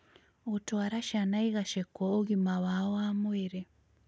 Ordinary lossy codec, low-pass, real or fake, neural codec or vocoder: none; none; real; none